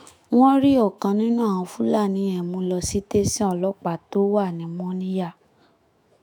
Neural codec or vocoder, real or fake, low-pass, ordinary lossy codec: autoencoder, 48 kHz, 128 numbers a frame, DAC-VAE, trained on Japanese speech; fake; none; none